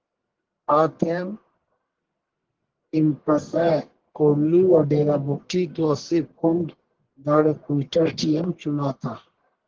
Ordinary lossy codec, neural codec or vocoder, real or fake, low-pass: Opus, 16 kbps; codec, 44.1 kHz, 1.7 kbps, Pupu-Codec; fake; 7.2 kHz